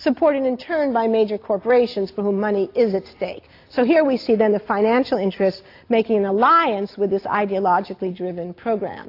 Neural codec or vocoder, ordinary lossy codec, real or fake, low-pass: none; AAC, 32 kbps; real; 5.4 kHz